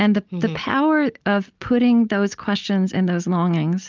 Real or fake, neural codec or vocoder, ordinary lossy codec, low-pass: real; none; Opus, 24 kbps; 7.2 kHz